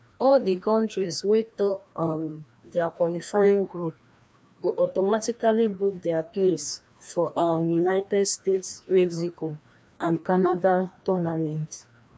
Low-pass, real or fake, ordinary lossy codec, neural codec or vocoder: none; fake; none; codec, 16 kHz, 1 kbps, FreqCodec, larger model